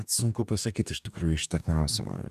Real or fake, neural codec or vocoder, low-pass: fake; codec, 44.1 kHz, 2.6 kbps, DAC; 14.4 kHz